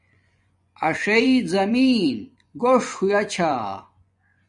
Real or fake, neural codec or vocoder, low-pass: fake; vocoder, 24 kHz, 100 mel bands, Vocos; 10.8 kHz